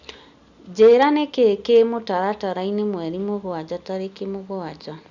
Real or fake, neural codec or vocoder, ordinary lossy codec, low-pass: real; none; Opus, 64 kbps; 7.2 kHz